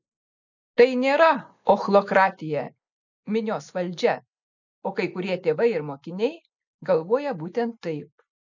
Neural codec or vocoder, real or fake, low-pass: none; real; 7.2 kHz